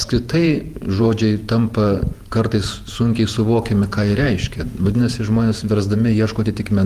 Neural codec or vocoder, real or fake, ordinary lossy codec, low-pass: vocoder, 48 kHz, 128 mel bands, Vocos; fake; Opus, 32 kbps; 14.4 kHz